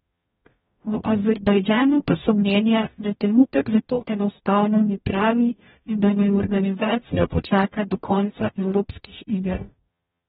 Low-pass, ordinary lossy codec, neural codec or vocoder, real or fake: 19.8 kHz; AAC, 16 kbps; codec, 44.1 kHz, 0.9 kbps, DAC; fake